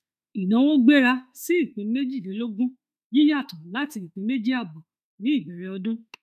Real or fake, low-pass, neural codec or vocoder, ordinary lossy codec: fake; 14.4 kHz; autoencoder, 48 kHz, 32 numbers a frame, DAC-VAE, trained on Japanese speech; none